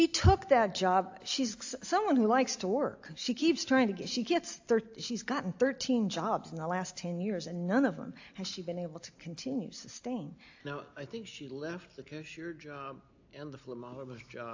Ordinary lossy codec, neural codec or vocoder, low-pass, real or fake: AAC, 48 kbps; none; 7.2 kHz; real